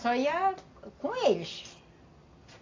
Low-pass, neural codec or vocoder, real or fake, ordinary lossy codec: 7.2 kHz; none; real; AAC, 32 kbps